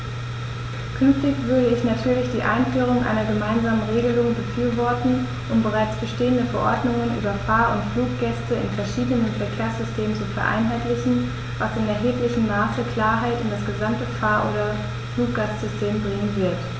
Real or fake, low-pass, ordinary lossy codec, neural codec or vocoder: real; none; none; none